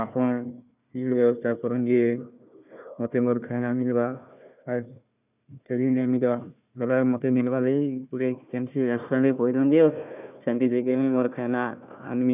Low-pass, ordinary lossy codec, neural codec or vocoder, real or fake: 3.6 kHz; none; codec, 16 kHz, 1 kbps, FunCodec, trained on Chinese and English, 50 frames a second; fake